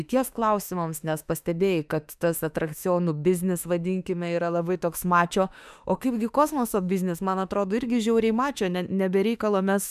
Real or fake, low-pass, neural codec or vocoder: fake; 14.4 kHz; autoencoder, 48 kHz, 32 numbers a frame, DAC-VAE, trained on Japanese speech